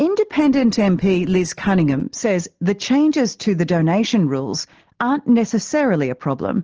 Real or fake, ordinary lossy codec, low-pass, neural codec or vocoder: real; Opus, 16 kbps; 7.2 kHz; none